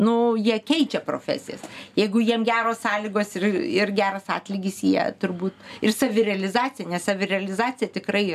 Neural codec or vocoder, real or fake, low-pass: none; real; 14.4 kHz